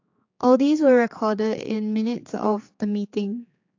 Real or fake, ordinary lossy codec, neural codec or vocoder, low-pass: fake; AAC, 48 kbps; codec, 16 kHz, 4 kbps, X-Codec, HuBERT features, trained on general audio; 7.2 kHz